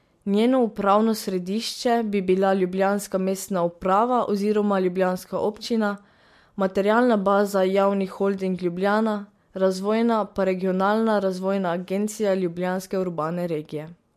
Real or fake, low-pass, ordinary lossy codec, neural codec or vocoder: real; 14.4 kHz; MP3, 64 kbps; none